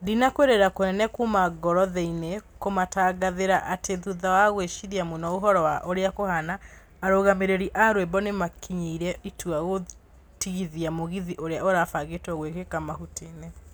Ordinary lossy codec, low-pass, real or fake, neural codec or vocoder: none; none; real; none